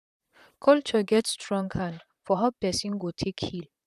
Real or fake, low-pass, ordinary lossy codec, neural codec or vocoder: fake; 14.4 kHz; none; vocoder, 44.1 kHz, 128 mel bands, Pupu-Vocoder